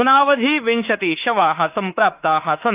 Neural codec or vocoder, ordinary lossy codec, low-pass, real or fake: autoencoder, 48 kHz, 32 numbers a frame, DAC-VAE, trained on Japanese speech; Opus, 32 kbps; 3.6 kHz; fake